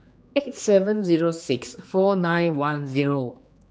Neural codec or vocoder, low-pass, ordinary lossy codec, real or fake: codec, 16 kHz, 2 kbps, X-Codec, HuBERT features, trained on general audio; none; none; fake